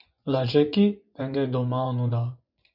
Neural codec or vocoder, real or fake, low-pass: vocoder, 24 kHz, 100 mel bands, Vocos; fake; 5.4 kHz